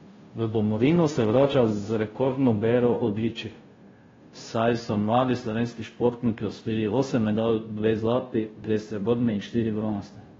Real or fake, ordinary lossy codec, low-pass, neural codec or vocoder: fake; AAC, 24 kbps; 7.2 kHz; codec, 16 kHz, 0.5 kbps, FunCodec, trained on Chinese and English, 25 frames a second